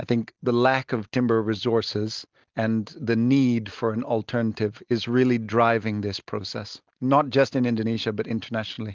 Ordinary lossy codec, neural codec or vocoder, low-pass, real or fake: Opus, 24 kbps; none; 7.2 kHz; real